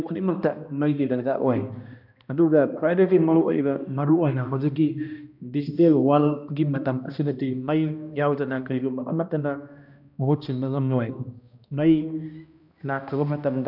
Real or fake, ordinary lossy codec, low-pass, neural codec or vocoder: fake; none; 5.4 kHz; codec, 16 kHz, 1 kbps, X-Codec, HuBERT features, trained on balanced general audio